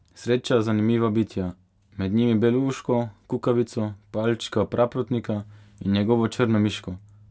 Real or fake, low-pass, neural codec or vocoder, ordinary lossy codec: real; none; none; none